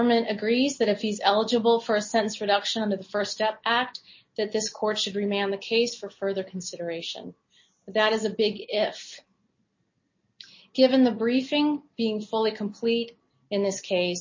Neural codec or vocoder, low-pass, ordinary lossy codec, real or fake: none; 7.2 kHz; MP3, 32 kbps; real